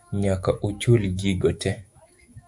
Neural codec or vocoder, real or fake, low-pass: autoencoder, 48 kHz, 128 numbers a frame, DAC-VAE, trained on Japanese speech; fake; 10.8 kHz